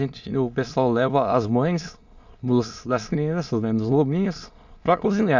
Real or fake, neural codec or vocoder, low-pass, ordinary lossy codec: fake; autoencoder, 22.05 kHz, a latent of 192 numbers a frame, VITS, trained on many speakers; 7.2 kHz; Opus, 64 kbps